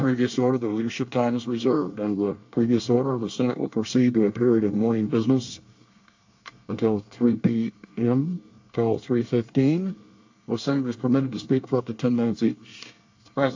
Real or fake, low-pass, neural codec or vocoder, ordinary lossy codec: fake; 7.2 kHz; codec, 24 kHz, 1 kbps, SNAC; AAC, 48 kbps